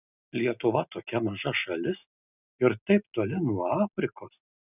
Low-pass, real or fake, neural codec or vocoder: 3.6 kHz; real; none